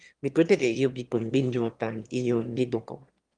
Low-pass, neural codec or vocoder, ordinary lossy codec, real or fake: 9.9 kHz; autoencoder, 22.05 kHz, a latent of 192 numbers a frame, VITS, trained on one speaker; Opus, 32 kbps; fake